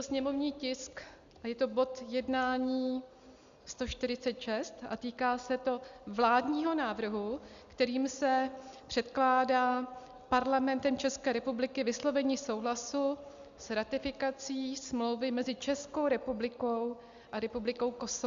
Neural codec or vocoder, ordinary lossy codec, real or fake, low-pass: none; Opus, 64 kbps; real; 7.2 kHz